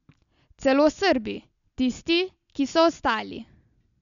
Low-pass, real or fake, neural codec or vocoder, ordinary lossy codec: 7.2 kHz; real; none; none